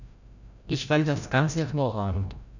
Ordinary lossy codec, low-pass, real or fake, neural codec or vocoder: none; 7.2 kHz; fake; codec, 16 kHz, 0.5 kbps, FreqCodec, larger model